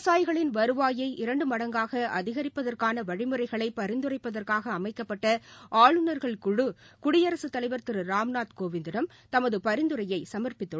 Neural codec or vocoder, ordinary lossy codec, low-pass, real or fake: none; none; none; real